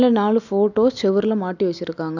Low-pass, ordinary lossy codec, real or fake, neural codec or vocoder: 7.2 kHz; none; real; none